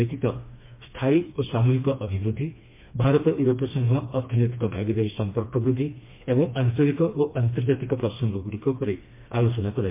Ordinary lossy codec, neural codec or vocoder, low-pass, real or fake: MP3, 24 kbps; codec, 16 kHz, 2 kbps, FreqCodec, smaller model; 3.6 kHz; fake